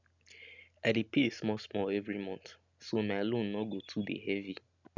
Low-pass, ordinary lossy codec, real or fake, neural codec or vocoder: 7.2 kHz; none; real; none